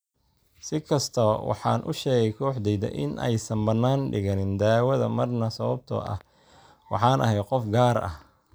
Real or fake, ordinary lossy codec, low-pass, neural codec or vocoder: real; none; none; none